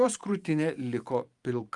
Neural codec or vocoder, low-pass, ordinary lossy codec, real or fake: vocoder, 44.1 kHz, 128 mel bands every 512 samples, BigVGAN v2; 10.8 kHz; Opus, 32 kbps; fake